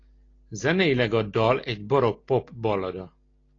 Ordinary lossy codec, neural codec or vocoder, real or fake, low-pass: AAC, 32 kbps; none; real; 7.2 kHz